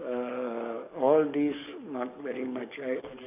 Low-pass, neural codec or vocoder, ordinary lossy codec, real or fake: 3.6 kHz; codec, 44.1 kHz, 7.8 kbps, DAC; none; fake